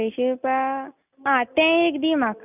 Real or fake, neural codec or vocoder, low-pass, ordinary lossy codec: real; none; 3.6 kHz; none